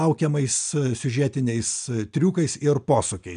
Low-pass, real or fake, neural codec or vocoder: 9.9 kHz; real; none